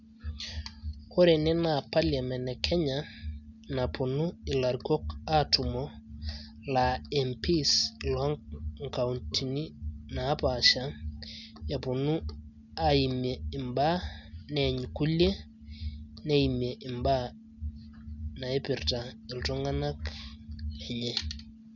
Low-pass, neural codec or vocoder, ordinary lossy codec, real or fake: 7.2 kHz; none; none; real